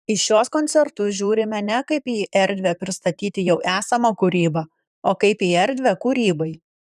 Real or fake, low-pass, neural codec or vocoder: fake; 14.4 kHz; vocoder, 44.1 kHz, 128 mel bands, Pupu-Vocoder